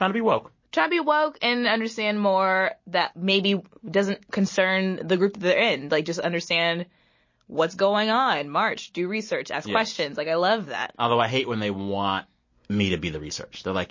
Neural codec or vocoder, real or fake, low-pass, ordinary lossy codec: none; real; 7.2 kHz; MP3, 32 kbps